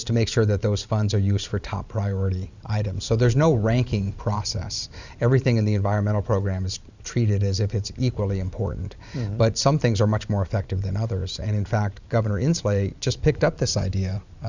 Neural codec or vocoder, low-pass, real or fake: vocoder, 44.1 kHz, 128 mel bands every 512 samples, BigVGAN v2; 7.2 kHz; fake